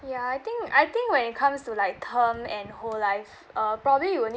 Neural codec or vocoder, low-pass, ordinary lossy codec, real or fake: none; none; none; real